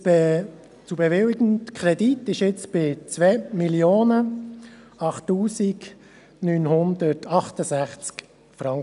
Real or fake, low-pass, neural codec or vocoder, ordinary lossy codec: real; 10.8 kHz; none; none